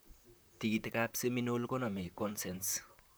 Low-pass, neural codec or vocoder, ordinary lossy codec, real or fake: none; vocoder, 44.1 kHz, 128 mel bands, Pupu-Vocoder; none; fake